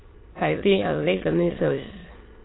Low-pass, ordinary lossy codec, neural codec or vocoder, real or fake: 7.2 kHz; AAC, 16 kbps; autoencoder, 22.05 kHz, a latent of 192 numbers a frame, VITS, trained on many speakers; fake